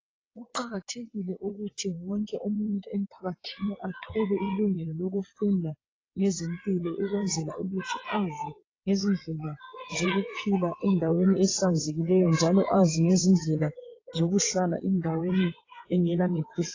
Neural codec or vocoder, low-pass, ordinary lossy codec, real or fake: vocoder, 22.05 kHz, 80 mel bands, WaveNeXt; 7.2 kHz; AAC, 32 kbps; fake